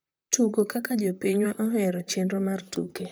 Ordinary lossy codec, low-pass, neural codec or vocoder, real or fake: none; none; vocoder, 44.1 kHz, 128 mel bands, Pupu-Vocoder; fake